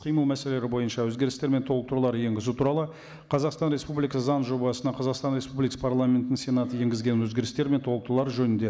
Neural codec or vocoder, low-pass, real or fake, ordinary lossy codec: none; none; real; none